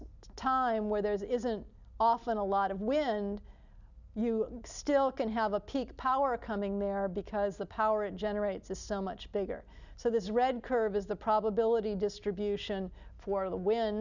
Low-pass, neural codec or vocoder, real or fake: 7.2 kHz; none; real